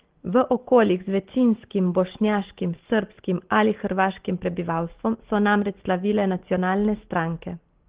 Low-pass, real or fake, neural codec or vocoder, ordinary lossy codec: 3.6 kHz; real; none; Opus, 16 kbps